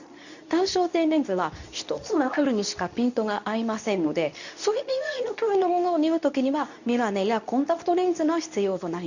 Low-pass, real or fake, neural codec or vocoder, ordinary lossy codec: 7.2 kHz; fake; codec, 24 kHz, 0.9 kbps, WavTokenizer, medium speech release version 2; none